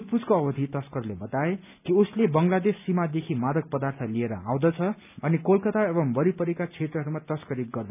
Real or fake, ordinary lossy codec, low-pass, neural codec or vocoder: real; none; 3.6 kHz; none